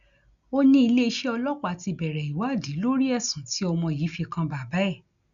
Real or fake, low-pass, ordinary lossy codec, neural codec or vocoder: real; 7.2 kHz; none; none